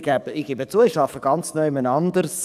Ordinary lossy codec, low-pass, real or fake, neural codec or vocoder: none; 14.4 kHz; fake; codec, 44.1 kHz, 7.8 kbps, DAC